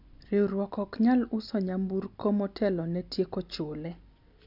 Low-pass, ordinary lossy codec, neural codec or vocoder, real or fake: 5.4 kHz; none; none; real